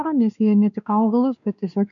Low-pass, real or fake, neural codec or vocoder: 7.2 kHz; fake; codec, 16 kHz, 2 kbps, X-Codec, WavLM features, trained on Multilingual LibriSpeech